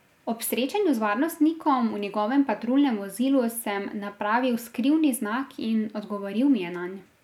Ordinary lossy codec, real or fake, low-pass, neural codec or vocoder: none; real; 19.8 kHz; none